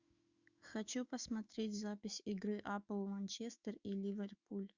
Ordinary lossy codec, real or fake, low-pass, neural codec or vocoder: Opus, 64 kbps; fake; 7.2 kHz; autoencoder, 48 kHz, 128 numbers a frame, DAC-VAE, trained on Japanese speech